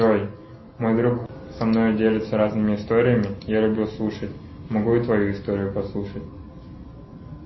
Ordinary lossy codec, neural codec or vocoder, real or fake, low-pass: MP3, 24 kbps; none; real; 7.2 kHz